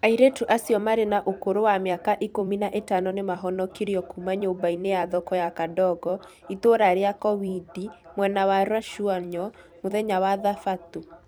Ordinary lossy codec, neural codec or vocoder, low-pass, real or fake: none; vocoder, 44.1 kHz, 128 mel bands every 256 samples, BigVGAN v2; none; fake